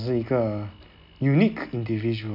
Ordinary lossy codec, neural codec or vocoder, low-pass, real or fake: MP3, 48 kbps; none; 5.4 kHz; real